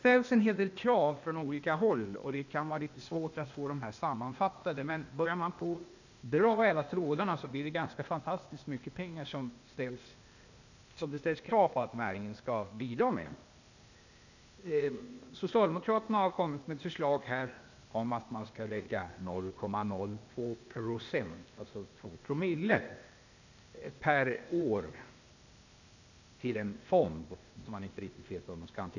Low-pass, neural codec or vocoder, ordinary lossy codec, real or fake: 7.2 kHz; codec, 16 kHz, 0.8 kbps, ZipCodec; none; fake